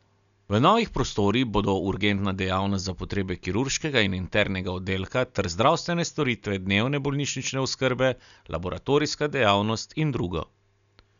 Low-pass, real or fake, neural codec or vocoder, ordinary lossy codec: 7.2 kHz; real; none; none